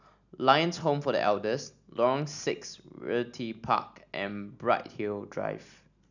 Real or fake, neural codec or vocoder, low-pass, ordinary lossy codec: real; none; 7.2 kHz; none